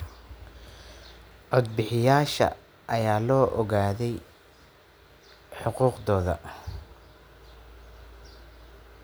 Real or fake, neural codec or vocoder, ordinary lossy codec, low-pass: real; none; none; none